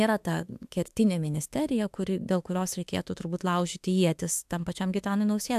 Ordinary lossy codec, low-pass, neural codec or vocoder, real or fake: AAC, 96 kbps; 14.4 kHz; autoencoder, 48 kHz, 32 numbers a frame, DAC-VAE, trained on Japanese speech; fake